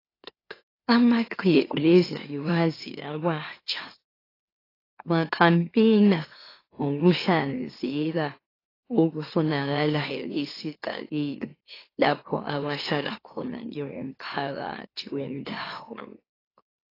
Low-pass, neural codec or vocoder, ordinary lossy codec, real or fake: 5.4 kHz; autoencoder, 44.1 kHz, a latent of 192 numbers a frame, MeloTTS; AAC, 24 kbps; fake